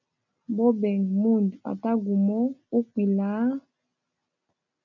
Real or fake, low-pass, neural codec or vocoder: real; 7.2 kHz; none